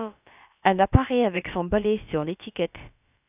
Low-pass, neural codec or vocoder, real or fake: 3.6 kHz; codec, 16 kHz, about 1 kbps, DyCAST, with the encoder's durations; fake